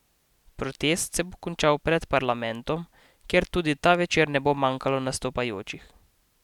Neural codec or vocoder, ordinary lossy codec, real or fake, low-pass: none; none; real; 19.8 kHz